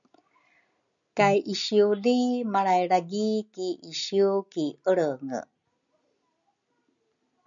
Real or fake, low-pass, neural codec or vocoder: real; 7.2 kHz; none